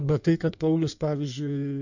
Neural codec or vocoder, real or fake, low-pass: codec, 16 kHz in and 24 kHz out, 1.1 kbps, FireRedTTS-2 codec; fake; 7.2 kHz